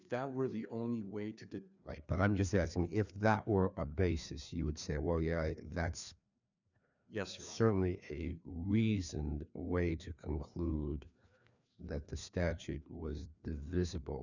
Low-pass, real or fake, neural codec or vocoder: 7.2 kHz; fake; codec, 16 kHz, 2 kbps, FreqCodec, larger model